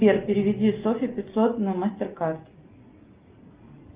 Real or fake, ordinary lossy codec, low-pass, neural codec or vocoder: real; Opus, 24 kbps; 3.6 kHz; none